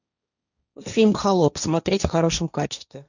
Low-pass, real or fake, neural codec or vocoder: 7.2 kHz; fake; codec, 16 kHz, 1.1 kbps, Voila-Tokenizer